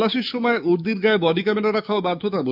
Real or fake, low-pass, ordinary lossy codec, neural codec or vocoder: fake; 5.4 kHz; none; autoencoder, 48 kHz, 128 numbers a frame, DAC-VAE, trained on Japanese speech